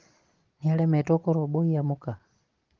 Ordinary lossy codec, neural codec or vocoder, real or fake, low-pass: Opus, 16 kbps; none; real; 7.2 kHz